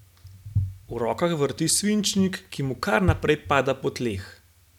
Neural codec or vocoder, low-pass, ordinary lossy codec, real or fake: none; 19.8 kHz; none; real